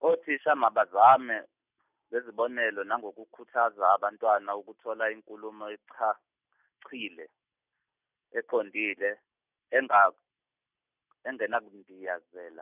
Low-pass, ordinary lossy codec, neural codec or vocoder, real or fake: 3.6 kHz; none; none; real